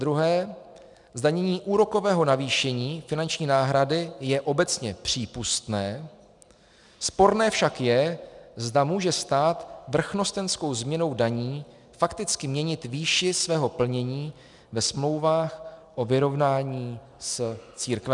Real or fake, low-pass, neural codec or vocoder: real; 10.8 kHz; none